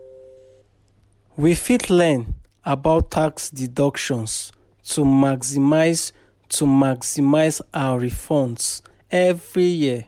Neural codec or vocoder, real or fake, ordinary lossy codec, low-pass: none; real; MP3, 96 kbps; 19.8 kHz